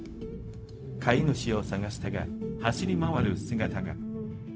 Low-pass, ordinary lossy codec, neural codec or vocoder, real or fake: none; none; codec, 16 kHz, 0.4 kbps, LongCat-Audio-Codec; fake